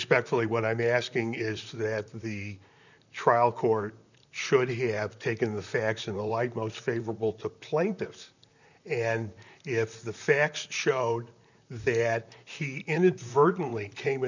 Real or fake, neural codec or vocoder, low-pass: fake; vocoder, 44.1 kHz, 128 mel bands, Pupu-Vocoder; 7.2 kHz